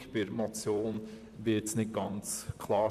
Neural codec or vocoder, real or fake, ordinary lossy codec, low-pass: vocoder, 44.1 kHz, 128 mel bands, Pupu-Vocoder; fake; none; 14.4 kHz